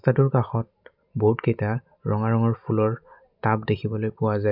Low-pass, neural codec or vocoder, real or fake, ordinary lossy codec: 5.4 kHz; none; real; none